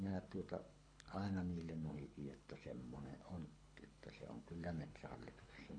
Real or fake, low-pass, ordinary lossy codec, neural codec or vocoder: fake; 9.9 kHz; AAC, 48 kbps; codec, 24 kHz, 6 kbps, HILCodec